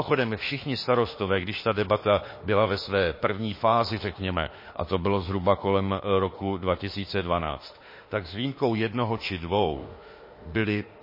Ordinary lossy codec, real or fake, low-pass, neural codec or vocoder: MP3, 24 kbps; fake; 5.4 kHz; autoencoder, 48 kHz, 32 numbers a frame, DAC-VAE, trained on Japanese speech